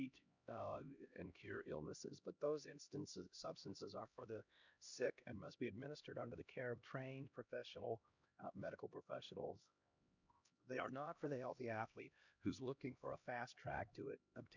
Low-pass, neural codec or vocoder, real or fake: 7.2 kHz; codec, 16 kHz, 1 kbps, X-Codec, HuBERT features, trained on LibriSpeech; fake